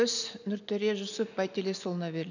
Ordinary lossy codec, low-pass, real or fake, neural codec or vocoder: none; 7.2 kHz; real; none